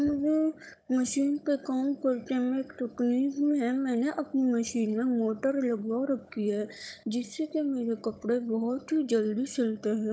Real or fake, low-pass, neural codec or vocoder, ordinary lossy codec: fake; none; codec, 16 kHz, 16 kbps, FunCodec, trained on Chinese and English, 50 frames a second; none